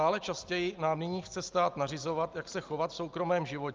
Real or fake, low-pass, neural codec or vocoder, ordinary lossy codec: real; 7.2 kHz; none; Opus, 24 kbps